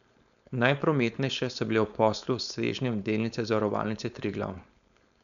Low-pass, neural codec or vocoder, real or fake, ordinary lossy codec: 7.2 kHz; codec, 16 kHz, 4.8 kbps, FACodec; fake; none